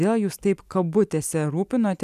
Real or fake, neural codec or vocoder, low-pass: real; none; 14.4 kHz